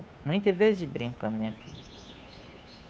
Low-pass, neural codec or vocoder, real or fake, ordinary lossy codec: none; codec, 16 kHz, 2 kbps, FunCodec, trained on Chinese and English, 25 frames a second; fake; none